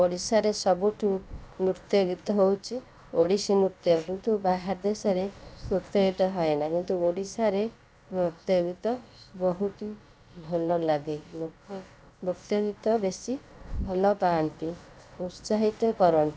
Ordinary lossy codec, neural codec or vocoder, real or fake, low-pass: none; codec, 16 kHz, about 1 kbps, DyCAST, with the encoder's durations; fake; none